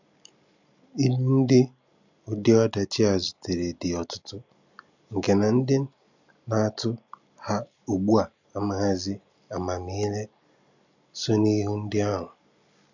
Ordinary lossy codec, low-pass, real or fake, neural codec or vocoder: none; 7.2 kHz; real; none